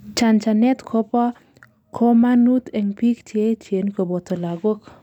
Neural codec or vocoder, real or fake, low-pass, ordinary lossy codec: none; real; 19.8 kHz; none